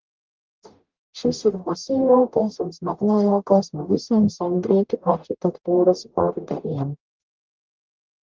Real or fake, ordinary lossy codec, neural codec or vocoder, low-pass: fake; Opus, 32 kbps; codec, 44.1 kHz, 0.9 kbps, DAC; 7.2 kHz